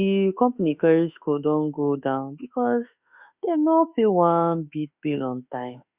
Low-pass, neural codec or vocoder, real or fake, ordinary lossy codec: 3.6 kHz; autoencoder, 48 kHz, 32 numbers a frame, DAC-VAE, trained on Japanese speech; fake; Opus, 64 kbps